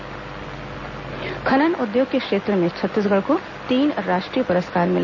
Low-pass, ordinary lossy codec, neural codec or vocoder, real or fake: 7.2 kHz; none; none; real